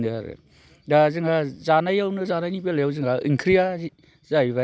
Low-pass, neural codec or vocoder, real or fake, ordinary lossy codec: none; none; real; none